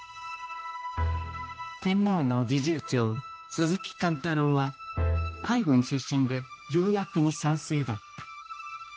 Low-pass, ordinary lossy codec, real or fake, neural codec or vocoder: none; none; fake; codec, 16 kHz, 1 kbps, X-Codec, HuBERT features, trained on general audio